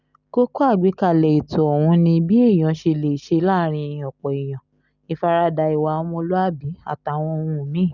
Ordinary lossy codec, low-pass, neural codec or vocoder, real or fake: none; 7.2 kHz; none; real